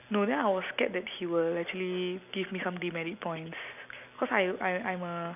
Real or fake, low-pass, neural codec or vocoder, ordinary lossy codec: real; 3.6 kHz; none; none